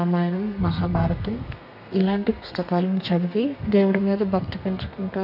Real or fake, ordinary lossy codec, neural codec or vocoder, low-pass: fake; none; codec, 32 kHz, 1.9 kbps, SNAC; 5.4 kHz